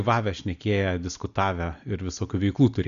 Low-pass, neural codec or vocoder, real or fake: 7.2 kHz; none; real